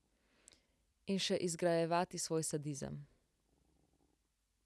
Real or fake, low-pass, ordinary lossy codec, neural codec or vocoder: real; none; none; none